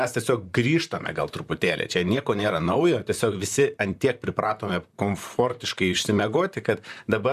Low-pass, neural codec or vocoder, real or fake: 14.4 kHz; vocoder, 44.1 kHz, 128 mel bands, Pupu-Vocoder; fake